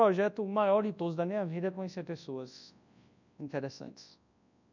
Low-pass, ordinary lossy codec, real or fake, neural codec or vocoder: 7.2 kHz; none; fake; codec, 24 kHz, 0.9 kbps, WavTokenizer, large speech release